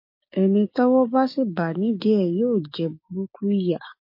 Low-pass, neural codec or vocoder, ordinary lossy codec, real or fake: 5.4 kHz; codec, 16 kHz, 6 kbps, DAC; MP3, 32 kbps; fake